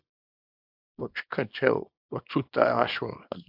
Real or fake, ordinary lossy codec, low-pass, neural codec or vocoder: fake; AAC, 48 kbps; 5.4 kHz; codec, 24 kHz, 0.9 kbps, WavTokenizer, small release